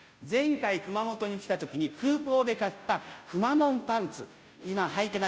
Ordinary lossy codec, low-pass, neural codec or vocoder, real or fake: none; none; codec, 16 kHz, 0.5 kbps, FunCodec, trained on Chinese and English, 25 frames a second; fake